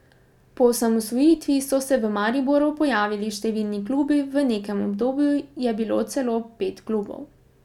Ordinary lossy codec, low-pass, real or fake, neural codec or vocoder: none; 19.8 kHz; real; none